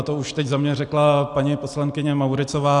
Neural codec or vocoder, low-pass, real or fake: none; 10.8 kHz; real